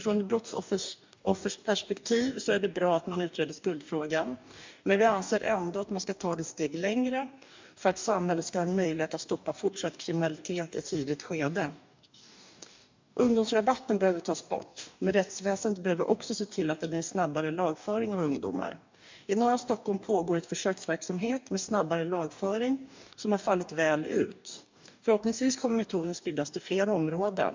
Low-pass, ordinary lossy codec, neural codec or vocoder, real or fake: 7.2 kHz; MP3, 64 kbps; codec, 44.1 kHz, 2.6 kbps, DAC; fake